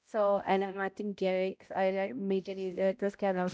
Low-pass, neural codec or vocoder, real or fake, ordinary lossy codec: none; codec, 16 kHz, 0.5 kbps, X-Codec, HuBERT features, trained on balanced general audio; fake; none